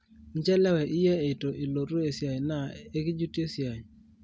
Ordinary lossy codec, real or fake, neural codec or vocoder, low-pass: none; real; none; none